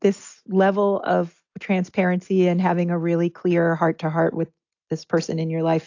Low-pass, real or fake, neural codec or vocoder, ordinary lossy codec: 7.2 kHz; real; none; AAC, 48 kbps